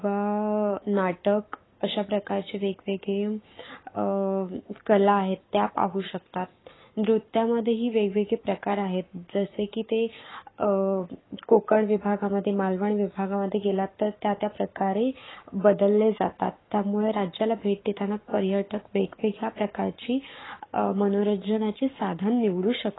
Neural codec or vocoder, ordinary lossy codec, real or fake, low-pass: codec, 44.1 kHz, 7.8 kbps, Pupu-Codec; AAC, 16 kbps; fake; 7.2 kHz